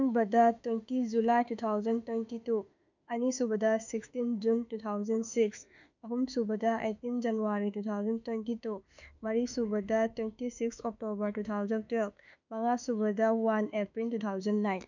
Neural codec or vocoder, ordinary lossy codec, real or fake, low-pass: autoencoder, 48 kHz, 32 numbers a frame, DAC-VAE, trained on Japanese speech; none; fake; 7.2 kHz